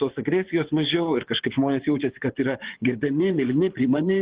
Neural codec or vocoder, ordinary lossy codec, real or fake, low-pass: vocoder, 24 kHz, 100 mel bands, Vocos; Opus, 16 kbps; fake; 3.6 kHz